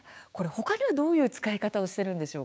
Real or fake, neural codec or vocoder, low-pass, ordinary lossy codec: fake; codec, 16 kHz, 6 kbps, DAC; none; none